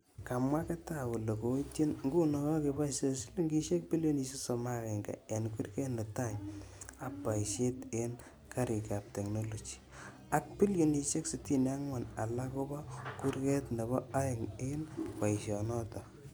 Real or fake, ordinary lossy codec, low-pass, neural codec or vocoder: real; none; none; none